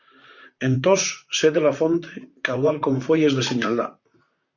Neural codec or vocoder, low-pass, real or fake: vocoder, 44.1 kHz, 128 mel bands, Pupu-Vocoder; 7.2 kHz; fake